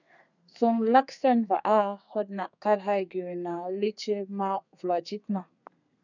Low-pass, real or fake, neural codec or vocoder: 7.2 kHz; fake; codec, 32 kHz, 1.9 kbps, SNAC